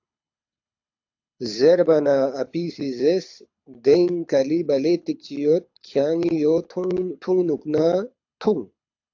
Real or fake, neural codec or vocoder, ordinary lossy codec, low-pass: fake; codec, 24 kHz, 6 kbps, HILCodec; AAC, 48 kbps; 7.2 kHz